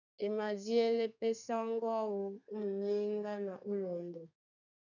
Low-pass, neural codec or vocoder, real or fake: 7.2 kHz; codec, 32 kHz, 1.9 kbps, SNAC; fake